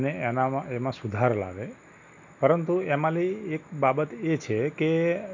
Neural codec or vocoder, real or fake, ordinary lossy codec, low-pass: none; real; none; 7.2 kHz